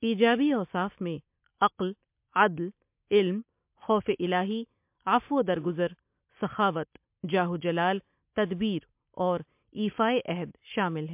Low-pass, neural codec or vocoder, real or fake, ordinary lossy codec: 3.6 kHz; none; real; MP3, 32 kbps